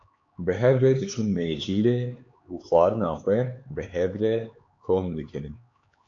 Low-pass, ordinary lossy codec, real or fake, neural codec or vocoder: 7.2 kHz; AAC, 48 kbps; fake; codec, 16 kHz, 4 kbps, X-Codec, HuBERT features, trained on LibriSpeech